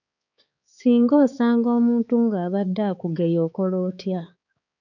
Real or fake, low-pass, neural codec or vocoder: fake; 7.2 kHz; codec, 16 kHz, 4 kbps, X-Codec, HuBERT features, trained on balanced general audio